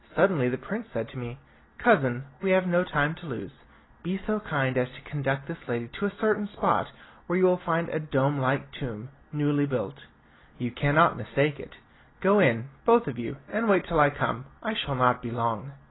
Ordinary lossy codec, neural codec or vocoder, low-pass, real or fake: AAC, 16 kbps; none; 7.2 kHz; real